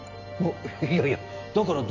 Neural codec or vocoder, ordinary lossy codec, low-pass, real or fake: none; AAC, 48 kbps; 7.2 kHz; real